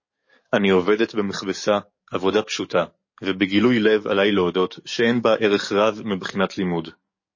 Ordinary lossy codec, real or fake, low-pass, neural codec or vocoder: MP3, 32 kbps; fake; 7.2 kHz; codec, 44.1 kHz, 7.8 kbps, DAC